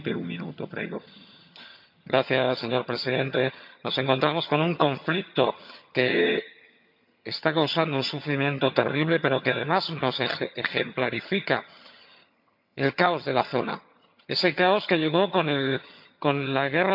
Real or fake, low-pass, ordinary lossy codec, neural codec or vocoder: fake; 5.4 kHz; MP3, 48 kbps; vocoder, 22.05 kHz, 80 mel bands, HiFi-GAN